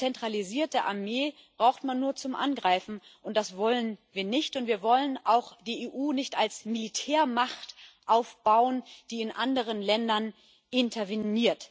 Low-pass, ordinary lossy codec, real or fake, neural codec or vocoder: none; none; real; none